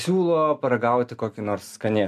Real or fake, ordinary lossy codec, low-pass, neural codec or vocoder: real; MP3, 96 kbps; 14.4 kHz; none